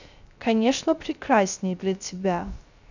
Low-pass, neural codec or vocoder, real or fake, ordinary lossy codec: 7.2 kHz; codec, 16 kHz, 0.3 kbps, FocalCodec; fake; none